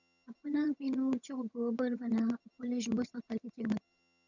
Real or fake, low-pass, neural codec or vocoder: fake; 7.2 kHz; vocoder, 22.05 kHz, 80 mel bands, HiFi-GAN